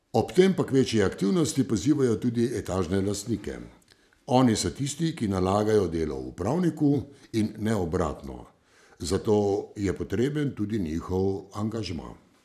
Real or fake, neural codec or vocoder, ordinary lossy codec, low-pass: fake; vocoder, 44.1 kHz, 128 mel bands every 512 samples, BigVGAN v2; none; 14.4 kHz